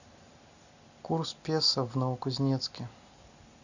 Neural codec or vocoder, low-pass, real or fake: none; 7.2 kHz; real